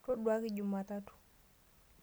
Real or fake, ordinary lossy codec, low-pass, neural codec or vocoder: real; none; none; none